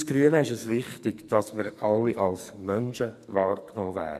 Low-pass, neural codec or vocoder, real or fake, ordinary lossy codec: 14.4 kHz; codec, 44.1 kHz, 2.6 kbps, SNAC; fake; none